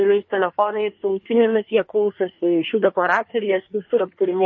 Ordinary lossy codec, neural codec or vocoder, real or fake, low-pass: MP3, 32 kbps; codec, 24 kHz, 1 kbps, SNAC; fake; 7.2 kHz